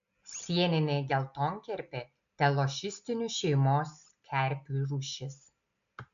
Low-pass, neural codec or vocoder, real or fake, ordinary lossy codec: 7.2 kHz; none; real; AAC, 64 kbps